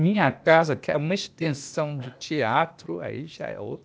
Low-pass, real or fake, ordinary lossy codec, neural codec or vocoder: none; fake; none; codec, 16 kHz, 0.8 kbps, ZipCodec